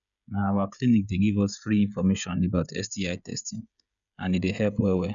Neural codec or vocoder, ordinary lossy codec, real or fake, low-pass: codec, 16 kHz, 16 kbps, FreqCodec, smaller model; none; fake; 7.2 kHz